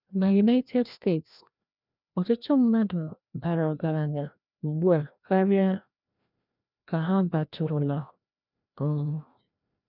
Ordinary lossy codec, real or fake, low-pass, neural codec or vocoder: none; fake; 5.4 kHz; codec, 16 kHz, 1 kbps, FreqCodec, larger model